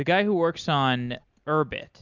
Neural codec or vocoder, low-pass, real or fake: none; 7.2 kHz; real